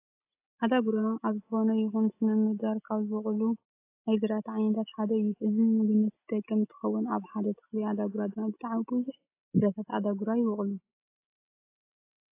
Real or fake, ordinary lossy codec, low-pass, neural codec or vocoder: real; AAC, 24 kbps; 3.6 kHz; none